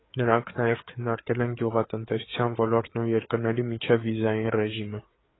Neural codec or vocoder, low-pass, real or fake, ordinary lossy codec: codec, 16 kHz, 4 kbps, FreqCodec, larger model; 7.2 kHz; fake; AAC, 16 kbps